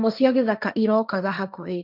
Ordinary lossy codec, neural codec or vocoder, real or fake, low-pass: none; codec, 16 kHz, 1.1 kbps, Voila-Tokenizer; fake; 5.4 kHz